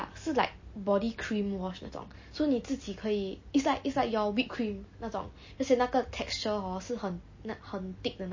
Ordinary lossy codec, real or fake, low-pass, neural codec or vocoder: MP3, 32 kbps; real; 7.2 kHz; none